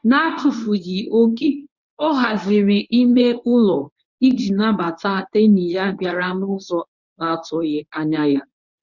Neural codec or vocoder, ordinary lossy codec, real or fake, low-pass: codec, 24 kHz, 0.9 kbps, WavTokenizer, medium speech release version 1; none; fake; 7.2 kHz